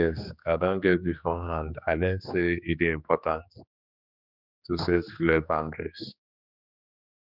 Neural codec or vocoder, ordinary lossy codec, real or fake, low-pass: codec, 16 kHz, 2 kbps, X-Codec, HuBERT features, trained on general audio; none; fake; 5.4 kHz